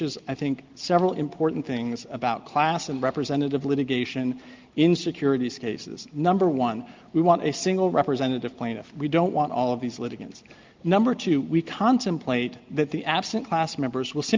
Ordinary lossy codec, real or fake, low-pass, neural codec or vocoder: Opus, 32 kbps; real; 7.2 kHz; none